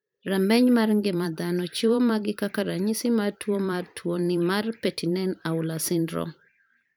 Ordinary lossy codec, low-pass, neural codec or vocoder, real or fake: none; none; vocoder, 44.1 kHz, 128 mel bands every 512 samples, BigVGAN v2; fake